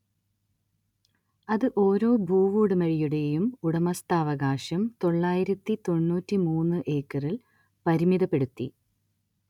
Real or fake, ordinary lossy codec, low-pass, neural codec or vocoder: real; none; 19.8 kHz; none